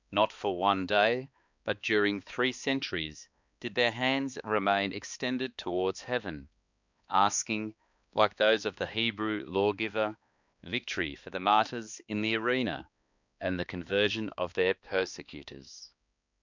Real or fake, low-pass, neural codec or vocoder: fake; 7.2 kHz; codec, 16 kHz, 2 kbps, X-Codec, HuBERT features, trained on balanced general audio